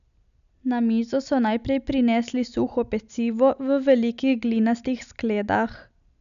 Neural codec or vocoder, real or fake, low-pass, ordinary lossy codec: none; real; 7.2 kHz; none